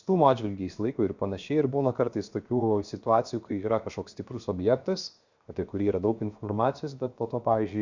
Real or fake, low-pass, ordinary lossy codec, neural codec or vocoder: fake; 7.2 kHz; Opus, 64 kbps; codec, 16 kHz, 0.7 kbps, FocalCodec